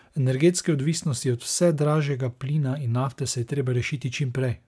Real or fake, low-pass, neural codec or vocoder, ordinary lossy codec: real; none; none; none